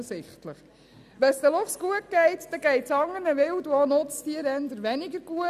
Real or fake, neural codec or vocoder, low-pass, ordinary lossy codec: real; none; 14.4 kHz; none